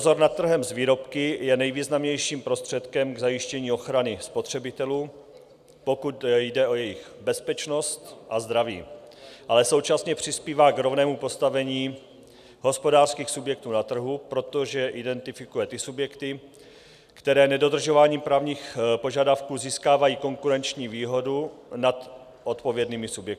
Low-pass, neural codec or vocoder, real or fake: 14.4 kHz; none; real